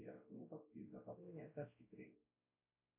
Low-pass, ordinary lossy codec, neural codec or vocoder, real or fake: 3.6 kHz; MP3, 24 kbps; codec, 16 kHz, 0.5 kbps, X-Codec, WavLM features, trained on Multilingual LibriSpeech; fake